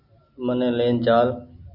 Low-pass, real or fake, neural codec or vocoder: 5.4 kHz; real; none